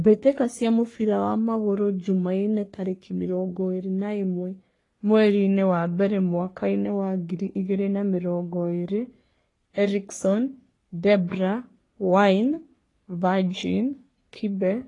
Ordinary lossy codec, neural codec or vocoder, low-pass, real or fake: AAC, 32 kbps; codec, 44.1 kHz, 3.4 kbps, Pupu-Codec; 10.8 kHz; fake